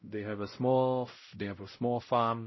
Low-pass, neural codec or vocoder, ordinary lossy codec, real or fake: 7.2 kHz; codec, 16 kHz, 0.5 kbps, X-Codec, WavLM features, trained on Multilingual LibriSpeech; MP3, 24 kbps; fake